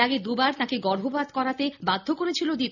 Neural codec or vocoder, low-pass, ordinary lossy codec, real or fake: none; 7.2 kHz; none; real